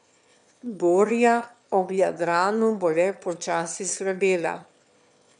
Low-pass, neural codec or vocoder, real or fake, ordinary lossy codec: 9.9 kHz; autoencoder, 22.05 kHz, a latent of 192 numbers a frame, VITS, trained on one speaker; fake; none